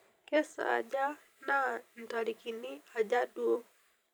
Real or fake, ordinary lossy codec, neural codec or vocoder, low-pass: fake; none; vocoder, 44.1 kHz, 128 mel bands every 512 samples, BigVGAN v2; none